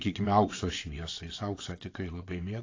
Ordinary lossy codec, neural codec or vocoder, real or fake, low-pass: AAC, 32 kbps; vocoder, 22.05 kHz, 80 mel bands, WaveNeXt; fake; 7.2 kHz